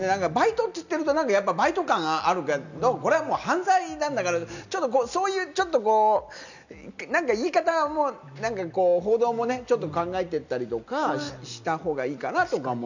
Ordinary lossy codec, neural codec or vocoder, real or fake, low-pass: none; none; real; 7.2 kHz